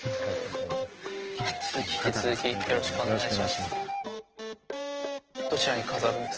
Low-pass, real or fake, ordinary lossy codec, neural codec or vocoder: 7.2 kHz; real; Opus, 16 kbps; none